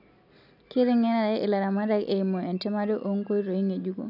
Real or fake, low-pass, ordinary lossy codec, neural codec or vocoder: real; 5.4 kHz; none; none